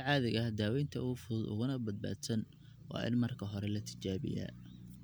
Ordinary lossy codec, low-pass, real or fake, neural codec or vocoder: none; none; real; none